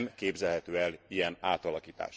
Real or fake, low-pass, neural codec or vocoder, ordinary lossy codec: real; none; none; none